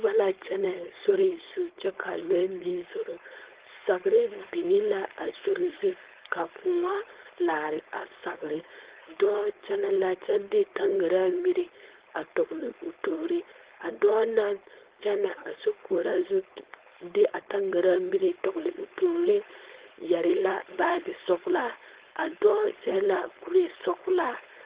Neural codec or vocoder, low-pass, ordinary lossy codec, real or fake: codec, 16 kHz, 4.8 kbps, FACodec; 3.6 kHz; Opus, 16 kbps; fake